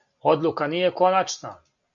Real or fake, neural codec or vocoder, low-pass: real; none; 7.2 kHz